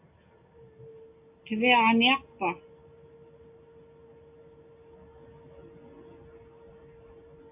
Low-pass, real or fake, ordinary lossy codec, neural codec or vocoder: 3.6 kHz; real; AAC, 32 kbps; none